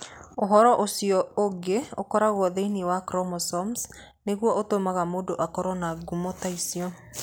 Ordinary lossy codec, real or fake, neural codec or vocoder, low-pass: none; real; none; none